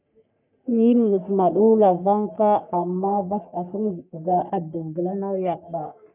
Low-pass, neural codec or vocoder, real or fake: 3.6 kHz; codec, 44.1 kHz, 3.4 kbps, Pupu-Codec; fake